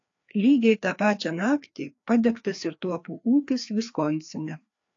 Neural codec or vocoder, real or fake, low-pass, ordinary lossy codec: codec, 16 kHz, 2 kbps, FreqCodec, larger model; fake; 7.2 kHz; AAC, 48 kbps